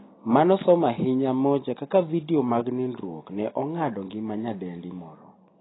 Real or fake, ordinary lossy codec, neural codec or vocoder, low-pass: real; AAC, 16 kbps; none; 7.2 kHz